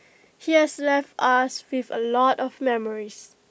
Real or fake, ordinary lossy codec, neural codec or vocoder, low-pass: real; none; none; none